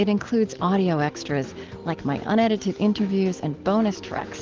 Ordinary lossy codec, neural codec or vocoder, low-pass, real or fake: Opus, 16 kbps; none; 7.2 kHz; real